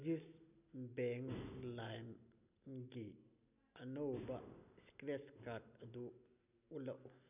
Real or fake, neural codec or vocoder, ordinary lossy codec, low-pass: real; none; none; 3.6 kHz